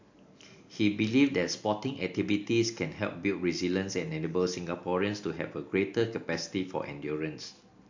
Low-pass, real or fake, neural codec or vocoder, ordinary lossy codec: 7.2 kHz; real; none; AAC, 48 kbps